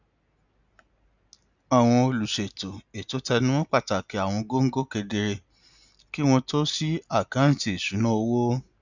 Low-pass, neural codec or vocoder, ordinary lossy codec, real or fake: 7.2 kHz; none; none; real